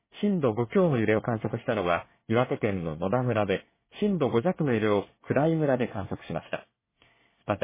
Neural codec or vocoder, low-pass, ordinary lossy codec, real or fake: codec, 24 kHz, 1 kbps, SNAC; 3.6 kHz; MP3, 16 kbps; fake